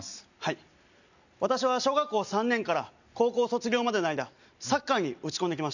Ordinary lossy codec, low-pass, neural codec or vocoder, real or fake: none; 7.2 kHz; none; real